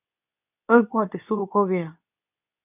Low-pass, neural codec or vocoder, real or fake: 3.6 kHz; codec, 24 kHz, 0.9 kbps, WavTokenizer, medium speech release version 2; fake